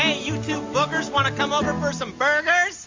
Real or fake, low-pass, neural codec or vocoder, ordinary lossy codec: real; 7.2 kHz; none; MP3, 48 kbps